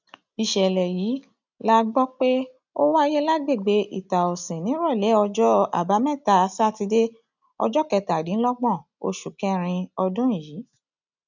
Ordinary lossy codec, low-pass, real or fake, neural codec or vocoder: none; 7.2 kHz; real; none